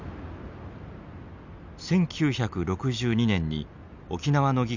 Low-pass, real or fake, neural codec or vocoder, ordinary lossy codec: 7.2 kHz; real; none; none